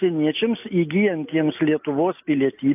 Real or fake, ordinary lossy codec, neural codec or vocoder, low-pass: real; AAC, 32 kbps; none; 3.6 kHz